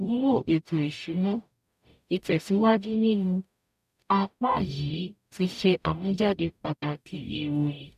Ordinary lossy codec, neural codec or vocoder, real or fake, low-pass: none; codec, 44.1 kHz, 0.9 kbps, DAC; fake; 14.4 kHz